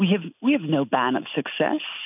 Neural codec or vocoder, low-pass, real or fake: none; 3.6 kHz; real